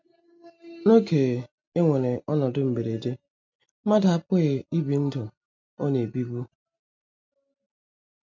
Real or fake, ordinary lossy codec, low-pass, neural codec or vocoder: real; MP3, 48 kbps; 7.2 kHz; none